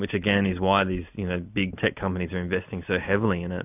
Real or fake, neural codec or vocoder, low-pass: real; none; 3.6 kHz